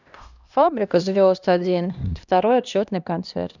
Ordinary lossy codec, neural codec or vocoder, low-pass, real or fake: none; codec, 16 kHz, 1 kbps, X-Codec, HuBERT features, trained on LibriSpeech; 7.2 kHz; fake